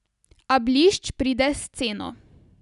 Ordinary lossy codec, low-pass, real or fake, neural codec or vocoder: none; 10.8 kHz; real; none